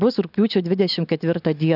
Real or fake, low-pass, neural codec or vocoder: real; 5.4 kHz; none